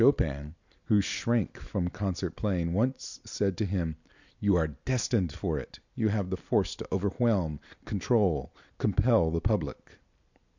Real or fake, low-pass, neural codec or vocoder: real; 7.2 kHz; none